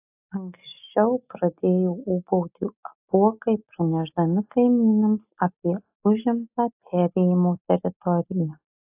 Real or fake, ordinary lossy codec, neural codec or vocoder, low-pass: real; AAC, 32 kbps; none; 3.6 kHz